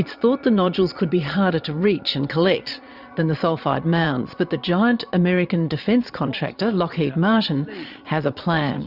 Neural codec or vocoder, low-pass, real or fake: vocoder, 22.05 kHz, 80 mel bands, Vocos; 5.4 kHz; fake